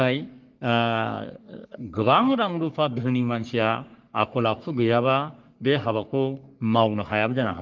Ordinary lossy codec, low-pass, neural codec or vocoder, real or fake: Opus, 32 kbps; 7.2 kHz; codec, 44.1 kHz, 3.4 kbps, Pupu-Codec; fake